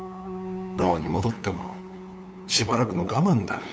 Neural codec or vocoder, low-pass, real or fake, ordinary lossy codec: codec, 16 kHz, 8 kbps, FunCodec, trained on LibriTTS, 25 frames a second; none; fake; none